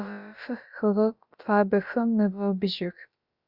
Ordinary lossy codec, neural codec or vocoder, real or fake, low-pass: Opus, 64 kbps; codec, 16 kHz, about 1 kbps, DyCAST, with the encoder's durations; fake; 5.4 kHz